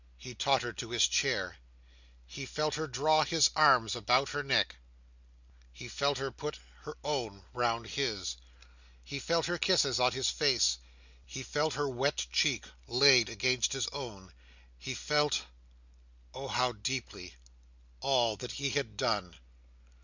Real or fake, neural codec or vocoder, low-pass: real; none; 7.2 kHz